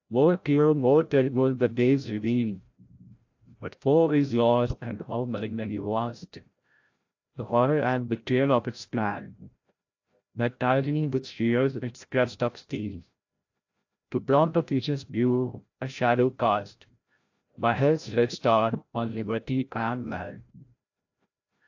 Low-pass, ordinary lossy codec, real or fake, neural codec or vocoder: 7.2 kHz; AAC, 48 kbps; fake; codec, 16 kHz, 0.5 kbps, FreqCodec, larger model